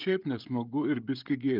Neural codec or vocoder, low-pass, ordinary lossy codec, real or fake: codec, 16 kHz, 16 kbps, FreqCodec, smaller model; 5.4 kHz; Opus, 24 kbps; fake